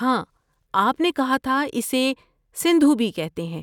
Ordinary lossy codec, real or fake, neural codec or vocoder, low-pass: none; real; none; 19.8 kHz